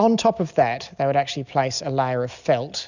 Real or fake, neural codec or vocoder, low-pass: real; none; 7.2 kHz